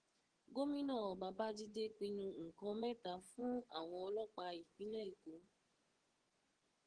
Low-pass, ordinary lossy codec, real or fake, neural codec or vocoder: 9.9 kHz; Opus, 16 kbps; fake; vocoder, 24 kHz, 100 mel bands, Vocos